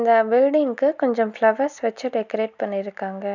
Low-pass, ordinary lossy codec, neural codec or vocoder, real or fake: 7.2 kHz; none; none; real